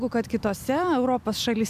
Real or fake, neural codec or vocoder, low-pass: real; none; 14.4 kHz